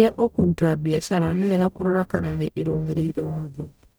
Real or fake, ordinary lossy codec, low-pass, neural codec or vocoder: fake; none; none; codec, 44.1 kHz, 0.9 kbps, DAC